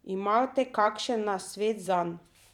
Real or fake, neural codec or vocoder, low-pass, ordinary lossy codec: fake; vocoder, 44.1 kHz, 128 mel bands every 512 samples, BigVGAN v2; 19.8 kHz; none